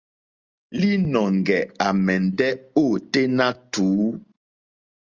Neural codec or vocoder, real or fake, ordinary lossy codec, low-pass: none; real; Opus, 32 kbps; 7.2 kHz